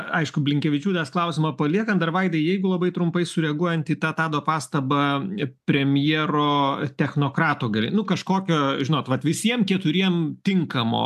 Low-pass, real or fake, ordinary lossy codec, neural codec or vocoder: 14.4 kHz; real; AAC, 96 kbps; none